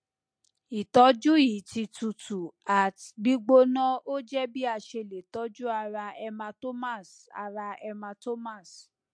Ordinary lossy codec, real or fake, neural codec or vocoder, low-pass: MP3, 48 kbps; real; none; 9.9 kHz